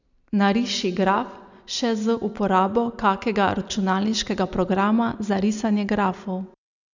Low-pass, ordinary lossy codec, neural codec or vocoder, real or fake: 7.2 kHz; none; none; real